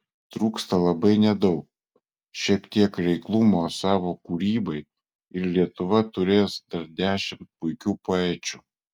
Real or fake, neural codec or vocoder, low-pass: real; none; 19.8 kHz